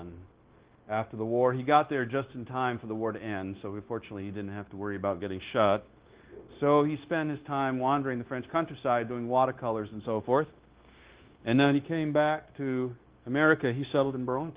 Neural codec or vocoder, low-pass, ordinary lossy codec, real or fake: codec, 16 kHz, 0.9 kbps, LongCat-Audio-Codec; 3.6 kHz; Opus, 16 kbps; fake